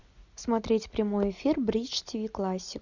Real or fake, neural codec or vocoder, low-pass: real; none; 7.2 kHz